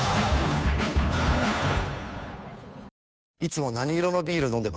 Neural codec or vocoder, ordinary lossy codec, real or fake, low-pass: codec, 16 kHz, 2 kbps, FunCodec, trained on Chinese and English, 25 frames a second; none; fake; none